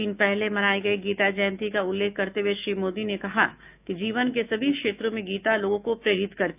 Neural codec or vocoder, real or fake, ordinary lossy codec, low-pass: autoencoder, 48 kHz, 128 numbers a frame, DAC-VAE, trained on Japanese speech; fake; none; 3.6 kHz